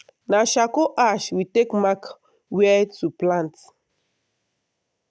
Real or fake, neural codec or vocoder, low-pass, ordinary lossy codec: real; none; none; none